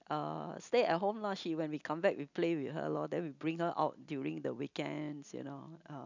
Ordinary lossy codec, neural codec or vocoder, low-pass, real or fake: none; none; 7.2 kHz; real